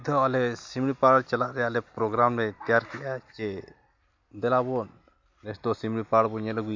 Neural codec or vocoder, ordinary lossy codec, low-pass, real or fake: none; MP3, 48 kbps; 7.2 kHz; real